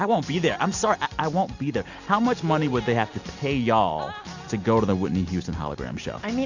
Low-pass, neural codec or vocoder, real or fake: 7.2 kHz; none; real